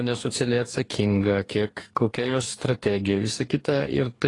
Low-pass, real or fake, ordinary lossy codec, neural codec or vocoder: 10.8 kHz; fake; AAC, 32 kbps; codec, 44.1 kHz, 2.6 kbps, DAC